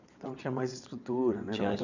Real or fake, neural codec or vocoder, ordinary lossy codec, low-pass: fake; vocoder, 22.05 kHz, 80 mel bands, WaveNeXt; none; 7.2 kHz